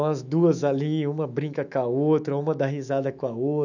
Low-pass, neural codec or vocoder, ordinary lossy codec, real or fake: 7.2 kHz; autoencoder, 48 kHz, 128 numbers a frame, DAC-VAE, trained on Japanese speech; none; fake